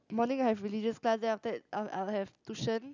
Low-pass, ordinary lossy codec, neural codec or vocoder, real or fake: 7.2 kHz; none; none; real